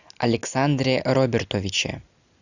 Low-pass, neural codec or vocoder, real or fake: 7.2 kHz; none; real